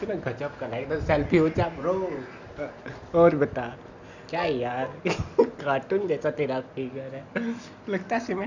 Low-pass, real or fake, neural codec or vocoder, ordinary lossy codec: 7.2 kHz; real; none; none